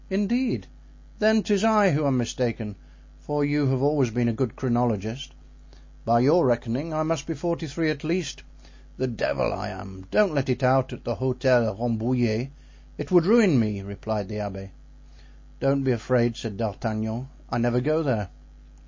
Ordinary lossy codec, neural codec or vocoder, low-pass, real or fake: MP3, 32 kbps; none; 7.2 kHz; real